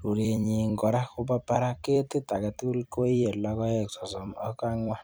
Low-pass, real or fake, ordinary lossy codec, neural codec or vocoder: none; fake; none; vocoder, 44.1 kHz, 128 mel bands every 256 samples, BigVGAN v2